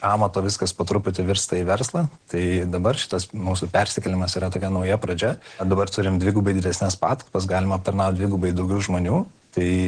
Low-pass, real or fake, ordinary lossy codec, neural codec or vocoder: 9.9 kHz; real; Opus, 16 kbps; none